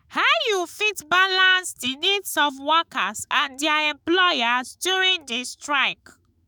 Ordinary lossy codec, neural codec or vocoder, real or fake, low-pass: none; autoencoder, 48 kHz, 128 numbers a frame, DAC-VAE, trained on Japanese speech; fake; none